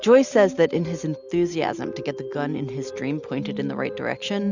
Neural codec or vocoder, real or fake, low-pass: none; real; 7.2 kHz